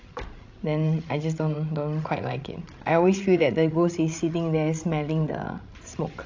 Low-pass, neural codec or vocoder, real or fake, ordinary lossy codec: 7.2 kHz; codec, 16 kHz, 16 kbps, FreqCodec, larger model; fake; AAC, 48 kbps